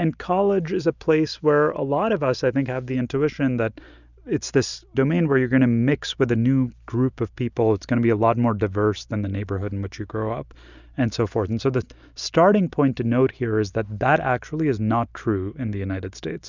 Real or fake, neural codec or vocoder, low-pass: real; none; 7.2 kHz